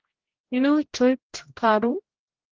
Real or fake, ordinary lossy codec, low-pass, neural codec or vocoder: fake; Opus, 16 kbps; 7.2 kHz; codec, 16 kHz, 0.5 kbps, X-Codec, HuBERT features, trained on general audio